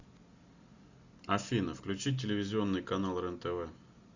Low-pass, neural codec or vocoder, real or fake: 7.2 kHz; none; real